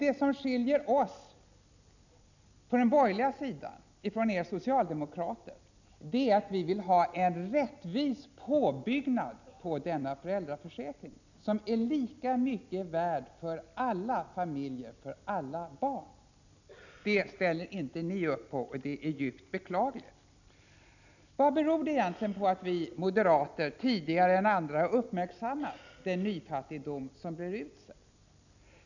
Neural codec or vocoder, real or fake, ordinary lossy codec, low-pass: none; real; none; 7.2 kHz